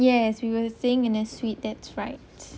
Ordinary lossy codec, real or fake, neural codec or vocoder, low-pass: none; real; none; none